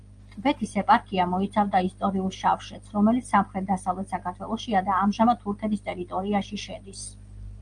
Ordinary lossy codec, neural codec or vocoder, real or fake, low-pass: Opus, 32 kbps; none; real; 9.9 kHz